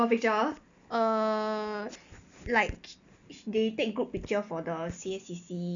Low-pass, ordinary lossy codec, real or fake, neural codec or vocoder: 7.2 kHz; none; real; none